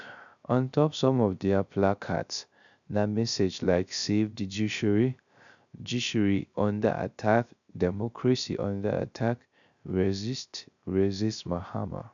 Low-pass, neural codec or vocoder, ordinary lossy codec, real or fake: 7.2 kHz; codec, 16 kHz, 0.3 kbps, FocalCodec; none; fake